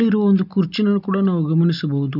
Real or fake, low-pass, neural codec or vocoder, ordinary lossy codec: real; 5.4 kHz; none; none